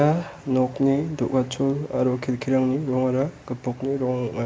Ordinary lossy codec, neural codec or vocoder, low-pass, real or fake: none; none; none; real